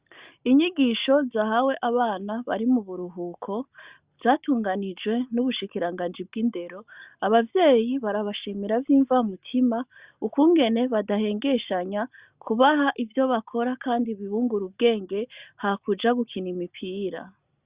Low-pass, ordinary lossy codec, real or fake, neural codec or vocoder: 3.6 kHz; Opus, 64 kbps; real; none